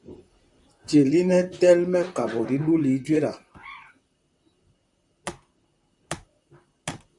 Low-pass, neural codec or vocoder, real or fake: 10.8 kHz; vocoder, 44.1 kHz, 128 mel bands, Pupu-Vocoder; fake